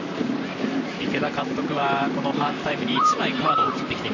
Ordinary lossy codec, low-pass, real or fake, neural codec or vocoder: none; 7.2 kHz; fake; vocoder, 44.1 kHz, 128 mel bands, Pupu-Vocoder